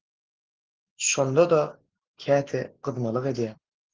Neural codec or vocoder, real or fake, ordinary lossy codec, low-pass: none; real; Opus, 16 kbps; 7.2 kHz